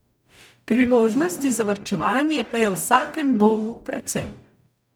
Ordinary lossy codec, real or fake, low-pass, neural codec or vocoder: none; fake; none; codec, 44.1 kHz, 0.9 kbps, DAC